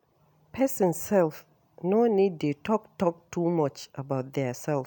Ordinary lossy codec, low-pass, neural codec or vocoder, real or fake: none; none; none; real